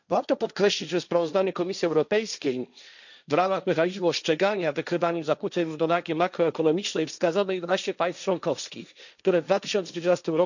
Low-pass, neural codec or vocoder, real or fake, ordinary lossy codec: 7.2 kHz; codec, 16 kHz, 1.1 kbps, Voila-Tokenizer; fake; none